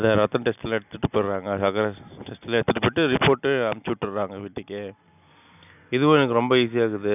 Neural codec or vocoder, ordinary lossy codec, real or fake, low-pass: none; none; real; 3.6 kHz